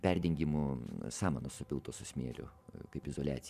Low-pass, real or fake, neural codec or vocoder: 14.4 kHz; fake; vocoder, 44.1 kHz, 128 mel bands every 512 samples, BigVGAN v2